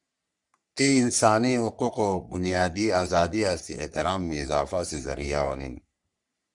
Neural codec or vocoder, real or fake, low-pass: codec, 44.1 kHz, 3.4 kbps, Pupu-Codec; fake; 10.8 kHz